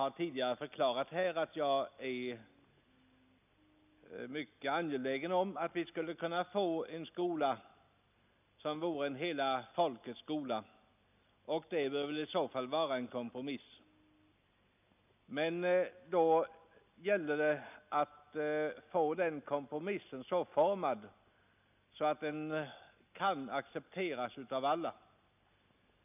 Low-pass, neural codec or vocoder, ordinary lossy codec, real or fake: 3.6 kHz; none; none; real